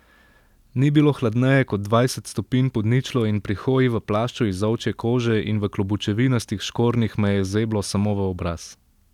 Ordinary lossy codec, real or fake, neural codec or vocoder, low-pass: none; real; none; 19.8 kHz